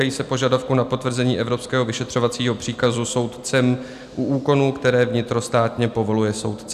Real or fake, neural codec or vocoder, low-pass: real; none; 14.4 kHz